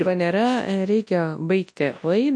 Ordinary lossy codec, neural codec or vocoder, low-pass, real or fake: MP3, 48 kbps; codec, 24 kHz, 0.9 kbps, WavTokenizer, large speech release; 9.9 kHz; fake